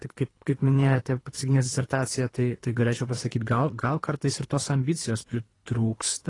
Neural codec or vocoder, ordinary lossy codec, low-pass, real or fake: codec, 24 kHz, 3 kbps, HILCodec; AAC, 32 kbps; 10.8 kHz; fake